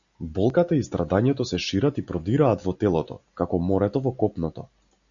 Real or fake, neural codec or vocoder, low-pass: real; none; 7.2 kHz